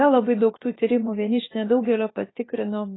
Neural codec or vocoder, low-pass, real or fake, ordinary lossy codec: codec, 16 kHz, about 1 kbps, DyCAST, with the encoder's durations; 7.2 kHz; fake; AAC, 16 kbps